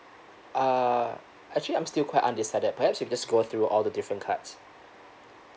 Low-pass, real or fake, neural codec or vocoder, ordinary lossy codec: none; real; none; none